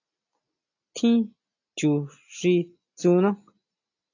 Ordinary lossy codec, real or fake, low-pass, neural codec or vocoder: AAC, 48 kbps; real; 7.2 kHz; none